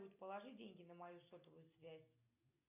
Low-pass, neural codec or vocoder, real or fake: 3.6 kHz; none; real